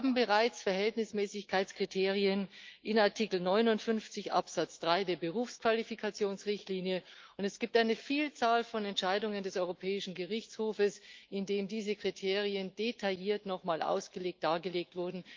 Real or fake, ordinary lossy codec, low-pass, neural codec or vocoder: fake; Opus, 32 kbps; 7.2 kHz; autoencoder, 48 kHz, 128 numbers a frame, DAC-VAE, trained on Japanese speech